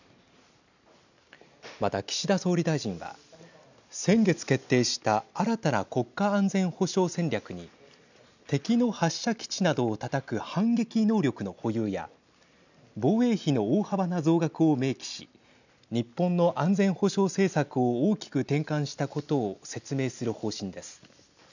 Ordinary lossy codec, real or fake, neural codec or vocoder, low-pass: none; real; none; 7.2 kHz